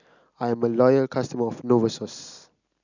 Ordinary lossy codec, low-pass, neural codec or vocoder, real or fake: none; 7.2 kHz; none; real